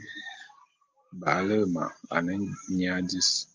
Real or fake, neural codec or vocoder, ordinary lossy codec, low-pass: real; none; Opus, 24 kbps; 7.2 kHz